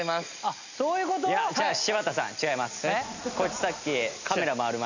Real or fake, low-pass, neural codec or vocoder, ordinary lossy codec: real; 7.2 kHz; none; none